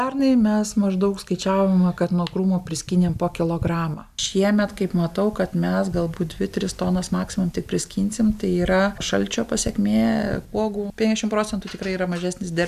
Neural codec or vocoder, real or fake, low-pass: none; real; 14.4 kHz